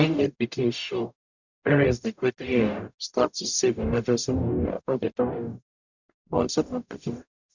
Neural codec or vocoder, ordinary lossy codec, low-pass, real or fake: codec, 44.1 kHz, 0.9 kbps, DAC; none; 7.2 kHz; fake